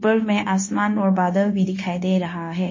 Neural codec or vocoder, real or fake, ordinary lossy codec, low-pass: codec, 16 kHz, 0.9 kbps, LongCat-Audio-Codec; fake; MP3, 32 kbps; 7.2 kHz